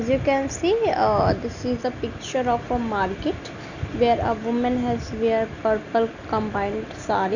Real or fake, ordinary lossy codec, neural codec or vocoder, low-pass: real; none; none; 7.2 kHz